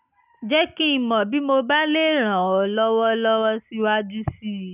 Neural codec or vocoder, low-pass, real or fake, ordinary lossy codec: none; 3.6 kHz; real; none